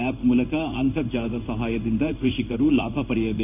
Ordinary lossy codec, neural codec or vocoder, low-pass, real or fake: none; codec, 16 kHz in and 24 kHz out, 1 kbps, XY-Tokenizer; 3.6 kHz; fake